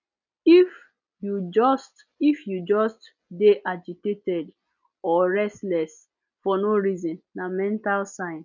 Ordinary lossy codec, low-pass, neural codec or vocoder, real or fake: none; 7.2 kHz; none; real